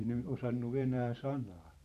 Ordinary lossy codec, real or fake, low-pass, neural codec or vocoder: none; fake; 14.4 kHz; vocoder, 44.1 kHz, 128 mel bands every 512 samples, BigVGAN v2